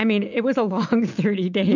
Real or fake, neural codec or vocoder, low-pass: real; none; 7.2 kHz